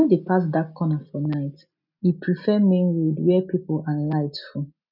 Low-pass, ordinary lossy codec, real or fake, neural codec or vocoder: 5.4 kHz; none; real; none